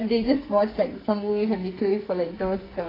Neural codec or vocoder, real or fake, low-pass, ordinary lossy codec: codec, 44.1 kHz, 2.6 kbps, SNAC; fake; 5.4 kHz; AAC, 24 kbps